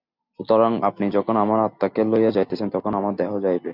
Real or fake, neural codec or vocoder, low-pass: real; none; 5.4 kHz